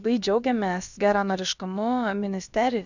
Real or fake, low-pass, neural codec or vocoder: fake; 7.2 kHz; codec, 16 kHz, about 1 kbps, DyCAST, with the encoder's durations